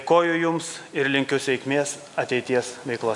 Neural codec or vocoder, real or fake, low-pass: none; real; 10.8 kHz